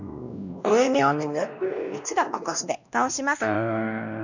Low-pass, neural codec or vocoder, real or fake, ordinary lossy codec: 7.2 kHz; codec, 16 kHz, 1 kbps, X-Codec, WavLM features, trained on Multilingual LibriSpeech; fake; none